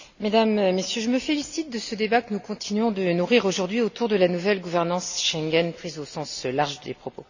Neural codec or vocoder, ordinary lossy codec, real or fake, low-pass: none; none; real; 7.2 kHz